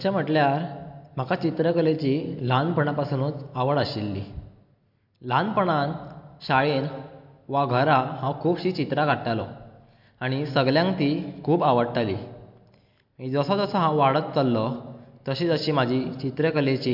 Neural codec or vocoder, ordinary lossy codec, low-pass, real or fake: none; MP3, 48 kbps; 5.4 kHz; real